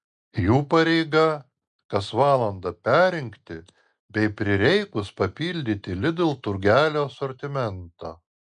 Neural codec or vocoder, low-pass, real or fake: none; 9.9 kHz; real